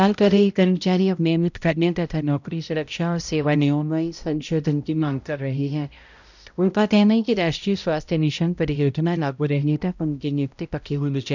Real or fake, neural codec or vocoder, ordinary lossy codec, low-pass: fake; codec, 16 kHz, 0.5 kbps, X-Codec, HuBERT features, trained on balanced general audio; none; 7.2 kHz